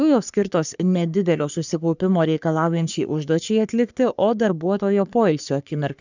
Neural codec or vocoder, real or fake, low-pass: codec, 44.1 kHz, 3.4 kbps, Pupu-Codec; fake; 7.2 kHz